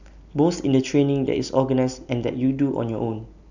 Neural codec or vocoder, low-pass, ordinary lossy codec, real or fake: none; 7.2 kHz; none; real